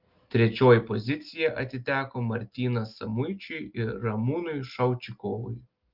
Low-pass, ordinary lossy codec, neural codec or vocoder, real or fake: 5.4 kHz; Opus, 32 kbps; none; real